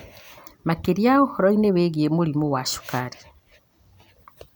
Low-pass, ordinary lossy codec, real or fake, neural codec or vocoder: none; none; real; none